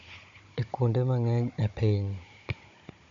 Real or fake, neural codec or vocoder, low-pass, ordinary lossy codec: fake; codec, 16 kHz, 16 kbps, FunCodec, trained on Chinese and English, 50 frames a second; 7.2 kHz; MP3, 48 kbps